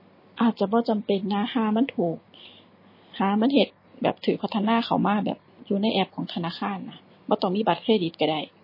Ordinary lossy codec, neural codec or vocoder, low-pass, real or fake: MP3, 24 kbps; none; 5.4 kHz; real